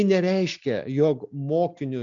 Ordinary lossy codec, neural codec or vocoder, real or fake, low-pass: MP3, 64 kbps; none; real; 7.2 kHz